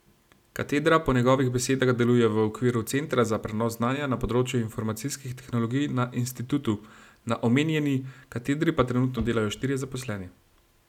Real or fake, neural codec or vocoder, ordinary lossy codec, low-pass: real; none; none; 19.8 kHz